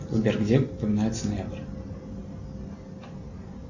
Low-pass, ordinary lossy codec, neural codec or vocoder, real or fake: 7.2 kHz; Opus, 64 kbps; none; real